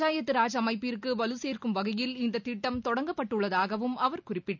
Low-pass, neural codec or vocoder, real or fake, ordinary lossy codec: 7.2 kHz; none; real; none